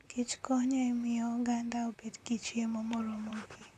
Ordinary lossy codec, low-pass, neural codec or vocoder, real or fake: none; 14.4 kHz; vocoder, 44.1 kHz, 128 mel bands, Pupu-Vocoder; fake